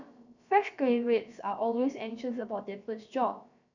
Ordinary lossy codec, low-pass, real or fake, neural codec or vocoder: none; 7.2 kHz; fake; codec, 16 kHz, about 1 kbps, DyCAST, with the encoder's durations